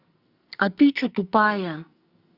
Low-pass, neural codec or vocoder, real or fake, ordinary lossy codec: 5.4 kHz; codec, 44.1 kHz, 2.6 kbps, SNAC; fake; Opus, 64 kbps